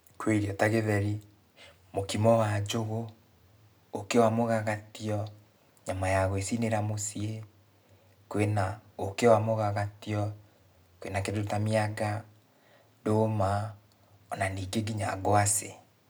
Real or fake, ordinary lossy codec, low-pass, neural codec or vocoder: real; none; none; none